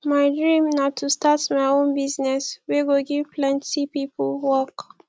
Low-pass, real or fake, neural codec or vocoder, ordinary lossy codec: none; real; none; none